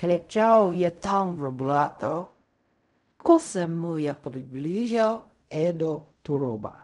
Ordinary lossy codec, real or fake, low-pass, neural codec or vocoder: none; fake; 10.8 kHz; codec, 16 kHz in and 24 kHz out, 0.4 kbps, LongCat-Audio-Codec, fine tuned four codebook decoder